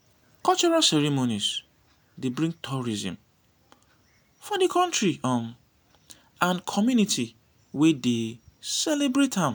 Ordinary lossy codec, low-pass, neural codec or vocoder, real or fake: none; none; none; real